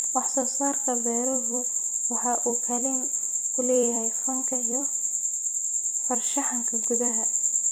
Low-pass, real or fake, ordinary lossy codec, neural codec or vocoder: none; fake; none; vocoder, 44.1 kHz, 128 mel bands every 256 samples, BigVGAN v2